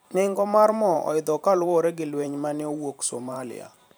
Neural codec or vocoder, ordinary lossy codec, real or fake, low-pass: vocoder, 44.1 kHz, 128 mel bands every 512 samples, BigVGAN v2; none; fake; none